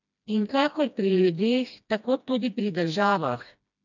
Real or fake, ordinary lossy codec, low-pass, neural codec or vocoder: fake; none; 7.2 kHz; codec, 16 kHz, 1 kbps, FreqCodec, smaller model